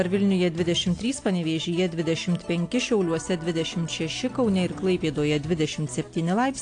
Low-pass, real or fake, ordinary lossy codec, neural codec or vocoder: 9.9 kHz; real; AAC, 48 kbps; none